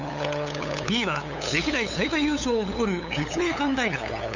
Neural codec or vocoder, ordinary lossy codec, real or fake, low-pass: codec, 16 kHz, 8 kbps, FunCodec, trained on LibriTTS, 25 frames a second; none; fake; 7.2 kHz